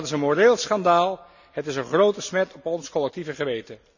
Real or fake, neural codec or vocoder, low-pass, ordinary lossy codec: real; none; 7.2 kHz; none